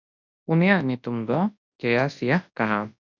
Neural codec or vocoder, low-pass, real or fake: codec, 24 kHz, 0.9 kbps, WavTokenizer, large speech release; 7.2 kHz; fake